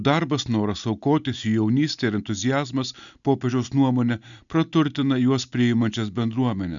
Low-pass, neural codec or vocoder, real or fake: 7.2 kHz; none; real